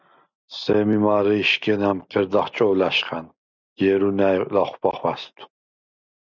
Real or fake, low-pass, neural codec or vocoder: real; 7.2 kHz; none